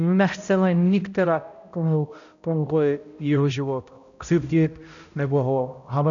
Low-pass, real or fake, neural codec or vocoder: 7.2 kHz; fake; codec, 16 kHz, 0.5 kbps, X-Codec, HuBERT features, trained on balanced general audio